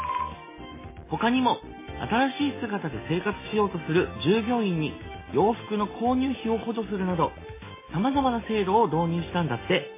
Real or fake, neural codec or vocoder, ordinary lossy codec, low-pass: real; none; MP3, 16 kbps; 3.6 kHz